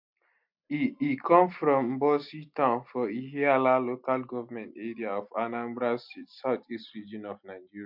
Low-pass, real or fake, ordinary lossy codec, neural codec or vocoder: 5.4 kHz; real; MP3, 48 kbps; none